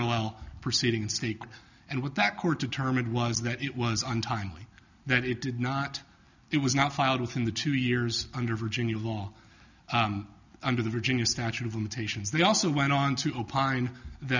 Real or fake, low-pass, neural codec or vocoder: real; 7.2 kHz; none